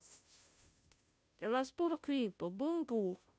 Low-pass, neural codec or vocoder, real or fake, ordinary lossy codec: none; codec, 16 kHz, 0.5 kbps, FunCodec, trained on Chinese and English, 25 frames a second; fake; none